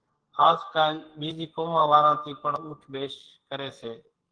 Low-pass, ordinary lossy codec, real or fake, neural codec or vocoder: 9.9 kHz; Opus, 16 kbps; fake; codec, 44.1 kHz, 2.6 kbps, SNAC